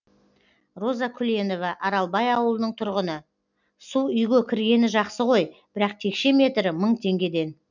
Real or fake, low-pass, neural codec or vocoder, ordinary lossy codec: real; 7.2 kHz; none; none